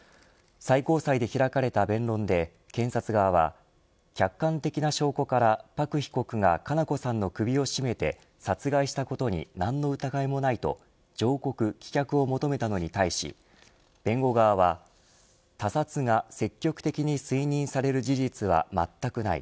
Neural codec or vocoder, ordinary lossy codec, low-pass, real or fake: none; none; none; real